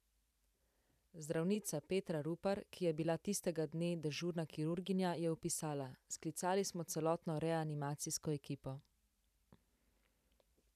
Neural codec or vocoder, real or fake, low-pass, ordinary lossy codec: vocoder, 44.1 kHz, 128 mel bands every 512 samples, BigVGAN v2; fake; 14.4 kHz; none